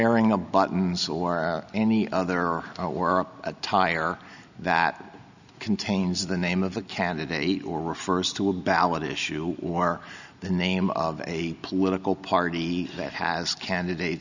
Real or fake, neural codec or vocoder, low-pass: real; none; 7.2 kHz